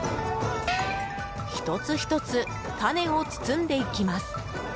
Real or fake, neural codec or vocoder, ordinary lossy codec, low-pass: real; none; none; none